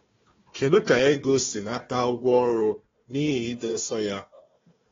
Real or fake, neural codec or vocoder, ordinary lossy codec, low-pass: fake; codec, 16 kHz, 1 kbps, FunCodec, trained on Chinese and English, 50 frames a second; AAC, 24 kbps; 7.2 kHz